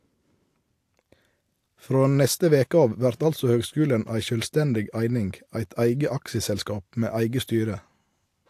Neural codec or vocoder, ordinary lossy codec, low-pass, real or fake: none; AAC, 64 kbps; 14.4 kHz; real